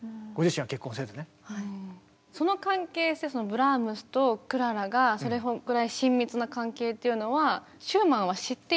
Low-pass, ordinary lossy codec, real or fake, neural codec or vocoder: none; none; real; none